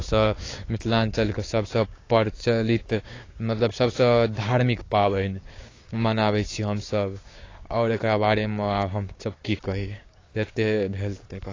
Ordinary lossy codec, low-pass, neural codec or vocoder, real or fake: AAC, 32 kbps; 7.2 kHz; codec, 16 kHz, 6 kbps, DAC; fake